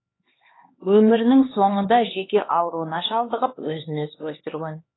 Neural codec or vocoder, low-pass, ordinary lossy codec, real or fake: codec, 16 kHz, 2 kbps, X-Codec, HuBERT features, trained on LibriSpeech; 7.2 kHz; AAC, 16 kbps; fake